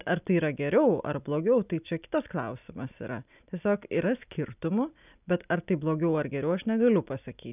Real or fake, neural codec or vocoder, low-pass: real; none; 3.6 kHz